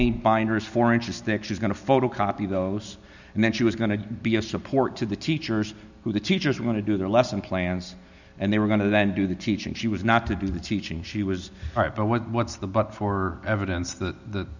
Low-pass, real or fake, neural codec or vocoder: 7.2 kHz; real; none